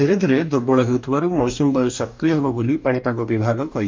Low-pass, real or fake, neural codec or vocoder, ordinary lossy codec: 7.2 kHz; fake; codec, 44.1 kHz, 2.6 kbps, DAC; MP3, 64 kbps